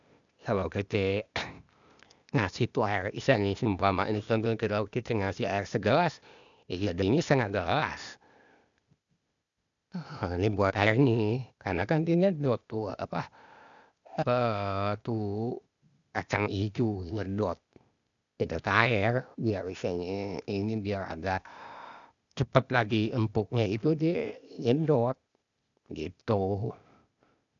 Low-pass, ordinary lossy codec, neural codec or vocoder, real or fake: 7.2 kHz; none; codec, 16 kHz, 0.8 kbps, ZipCodec; fake